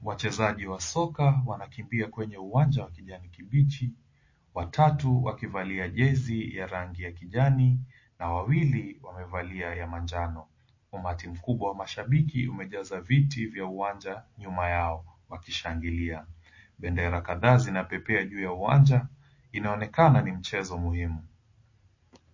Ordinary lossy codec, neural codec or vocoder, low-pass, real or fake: MP3, 32 kbps; none; 7.2 kHz; real